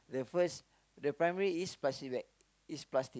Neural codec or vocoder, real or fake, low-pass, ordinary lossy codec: none; real; none; none